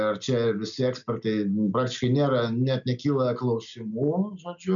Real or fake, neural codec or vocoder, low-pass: real; none; 7.2 kHz